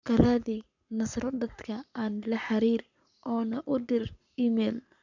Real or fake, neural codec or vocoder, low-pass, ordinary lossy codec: fake; codec, 44.1 kHz, 7.8 kbps, Pupu-Codec; 7.2 kHz; none